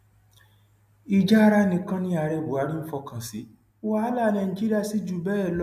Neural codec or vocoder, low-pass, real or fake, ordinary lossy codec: none; 14.4 kHz; real; MP3, 96 kbps